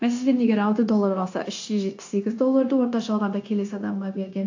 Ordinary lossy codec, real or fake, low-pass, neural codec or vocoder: AAC, 48 kbps; fake; 7.2 kHz; codec, 16 kHz, 0.9 kbps, LongCat-Audio-Codec